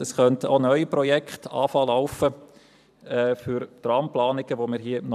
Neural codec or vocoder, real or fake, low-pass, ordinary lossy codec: vocoder, 44.1 kHz, 128 mel bands every 512 samples, BigVGAN v2; fake; 14.4 kHz; none